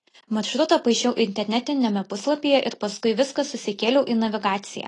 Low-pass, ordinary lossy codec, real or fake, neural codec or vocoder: 10.8 kHz; AAC, 32 kbps; real; none